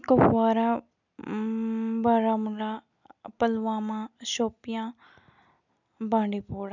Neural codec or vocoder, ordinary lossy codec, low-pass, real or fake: none; none; 7.2 kHz; real